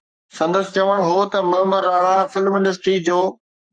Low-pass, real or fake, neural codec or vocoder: 9.9 kHz; fake; codec, 44.1 kHz, 3.4 kbps, Pupu-Codec